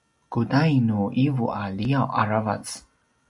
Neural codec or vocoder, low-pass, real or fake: none; 10.8 kHz; real